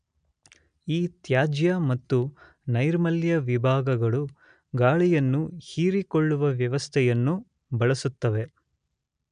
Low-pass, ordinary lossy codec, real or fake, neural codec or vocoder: 9.9 kHz; none; real; none